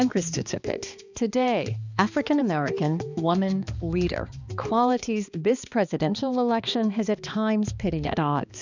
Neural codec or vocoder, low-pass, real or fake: codec, 16 kHz, 2 kbps, X-Codec, HuBERT features, trained on balanced general audio; 7.2 kHz; fake